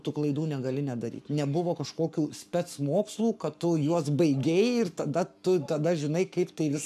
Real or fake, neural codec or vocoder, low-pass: fake; codec, 44.1 kHz, 7.8 kbps, Pupu-Codec; 14.4 kHz